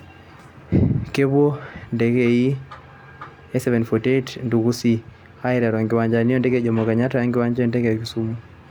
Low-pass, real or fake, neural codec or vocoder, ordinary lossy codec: 19.8 kHz; real; none; none